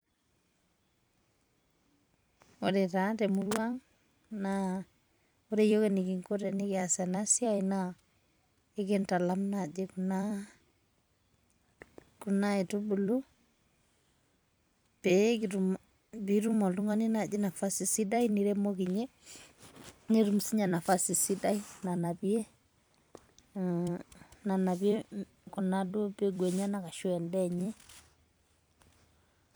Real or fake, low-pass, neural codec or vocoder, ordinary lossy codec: fake; none; vocoder, 44.1 kHz, 128 mel bands every 256 samples, BigVGAN v2; none